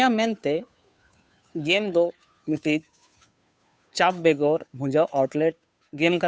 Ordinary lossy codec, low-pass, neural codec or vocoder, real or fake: none; none; codec, 16 kHz, 2 kbps, FunCodec, trained on Chinese and English, 25 frames a second; fake